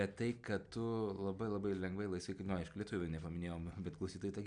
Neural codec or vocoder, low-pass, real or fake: none; 9.9 kHz; real